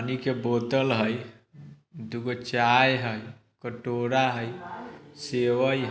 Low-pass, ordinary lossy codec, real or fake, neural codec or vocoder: none; none; real; none